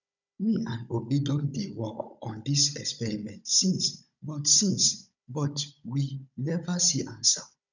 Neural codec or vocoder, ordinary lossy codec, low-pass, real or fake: codec, 16 kHz, 16 kbps, FunCodec, trained on Chinese and English, 50 frames a second; none; 7.2 kHz; fake